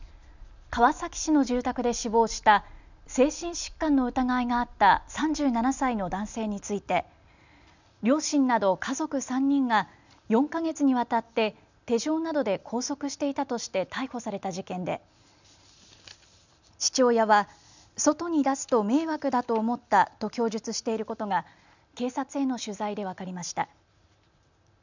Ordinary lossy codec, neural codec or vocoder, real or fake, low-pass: none; none; real; 7.2 kHz